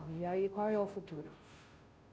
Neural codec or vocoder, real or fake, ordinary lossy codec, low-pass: codec, 16 kHz, 0.5 kbps, FunCodec, trained on Chinese and English, 25 frames a second; fake; none; none